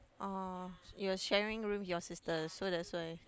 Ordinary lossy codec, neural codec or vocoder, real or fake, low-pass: none; none; real; none